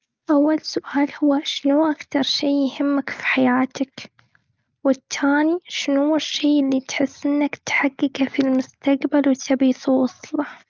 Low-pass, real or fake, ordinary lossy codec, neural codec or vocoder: 7.2 kHz; real; Opus, 24 kbps; none